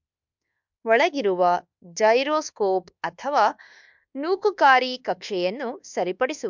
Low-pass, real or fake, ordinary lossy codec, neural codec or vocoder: 7.2 kHz; fake; MP3, 64 kbps; codec, 24 kHz, 1.2 kbps, DualCodec